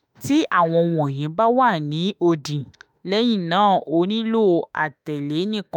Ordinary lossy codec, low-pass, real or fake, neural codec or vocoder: none; 19.8 kHz; fake; autoencoder, 48 kHz, 32 numbers a frame, DAC-VAE, trained on Japanese speech